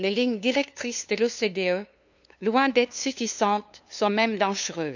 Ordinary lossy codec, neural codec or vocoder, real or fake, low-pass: none; codec, 16 kHz, 2 kbps, FunCodec, trained on LibriTTS, 25 frames a second; fake; 7.2 kHz